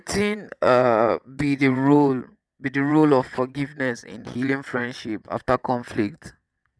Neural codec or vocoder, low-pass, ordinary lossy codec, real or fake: vocoder, 22.05 kHz, 80 mel bands, WaveNeXt; none; none; fake